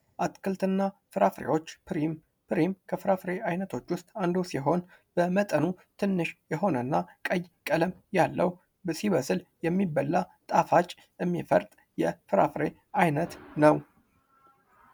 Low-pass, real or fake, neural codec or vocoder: 19.8 kHz; real; none